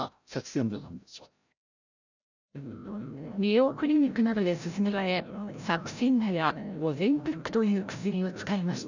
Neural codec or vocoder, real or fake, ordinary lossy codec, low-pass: codec, 16 kHz, 0.5 kbps, FreqCodec, larger model; fake; none; 7.2 kHz